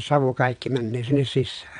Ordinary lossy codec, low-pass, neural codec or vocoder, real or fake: none; 9.9 kHz; vocoder, 22.05 kHz, 80 mel bands, WaveNeXt; fake